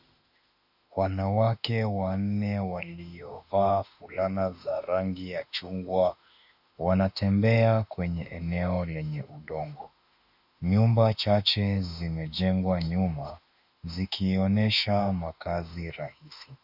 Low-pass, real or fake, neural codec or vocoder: 5.4 kHz; fake; autoencoder, 48 kHz, 32 numbers a frame, DAC-VAE, trained on Japanese speech